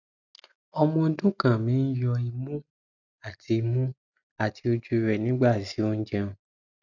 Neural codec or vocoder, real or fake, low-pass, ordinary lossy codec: none; real; 7.2 kHz; none